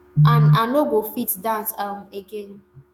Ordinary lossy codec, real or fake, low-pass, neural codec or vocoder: none; fake; 19.8 kHz; autoencoder, 48 kHz, 128 numbers a frame, DAC-VAE, trained on Japanese speech